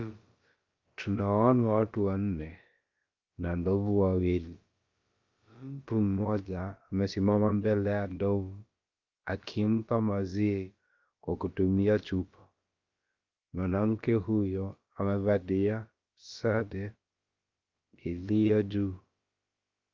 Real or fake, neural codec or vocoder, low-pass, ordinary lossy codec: fake; codec, 16 kHz, about 1 kbps, DyCAST, with the encoder's durations; 7.2 kHz; Opus, 32 kbps